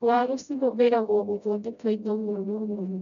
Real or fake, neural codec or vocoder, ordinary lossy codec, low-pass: fake; codec, 16 kHz, 0.5 kbps, FreqCodec, smaller model; none; 7.2 kHz